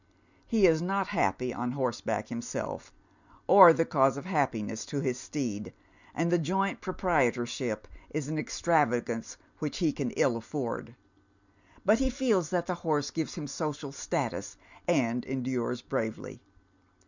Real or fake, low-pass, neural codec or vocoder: real; 7.2 kHz; none